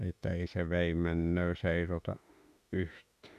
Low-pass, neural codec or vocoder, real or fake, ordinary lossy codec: 14.4 kHz; autoencoder, 48 kHz, 32 numbers a frame, DAC-VAE, trained on Japanese speech; fake; none